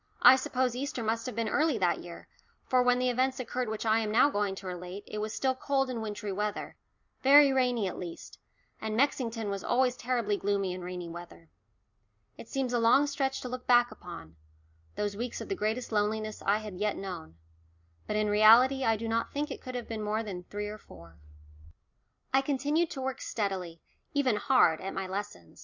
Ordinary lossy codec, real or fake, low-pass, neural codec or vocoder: Opus, 64 kbps; real; 7.2 kHz; none